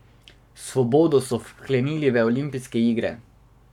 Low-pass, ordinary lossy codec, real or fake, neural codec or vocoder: 19.8 kHz; none; fake; codec, 44.1 kHz, 7.8 kbps, Pupu-Codec